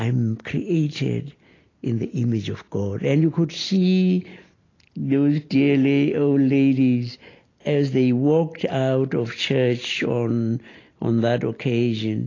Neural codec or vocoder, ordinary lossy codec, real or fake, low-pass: none; AAC, 32 kbps; real; 7.2 kHz